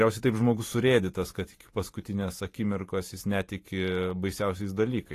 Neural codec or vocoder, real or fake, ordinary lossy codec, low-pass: vocoder, 44.1 kHz, 128 mel bands every 512 samples, BigVGAN v2; fake; AAC, 48 kbps; 14.4 kHz